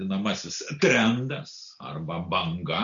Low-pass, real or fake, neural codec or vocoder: 7.2 kHz; real; none